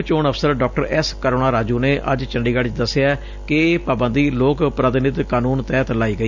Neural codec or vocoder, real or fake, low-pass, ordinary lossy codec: none; real; 7.2 kHz; none